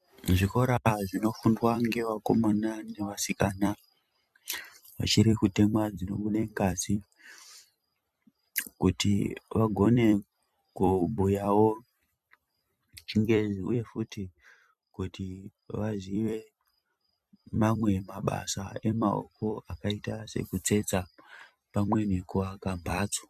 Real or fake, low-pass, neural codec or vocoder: fake; 14.4 kHz; vocoder, 44.1 kHz, 128 mel bands every 256 samples, BigVGAN v2